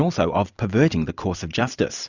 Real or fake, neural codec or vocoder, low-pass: fake; vocoder, 44.1 kHz, 128 mel bands every 256 samples, BigVGAN v2; 7.2 kHz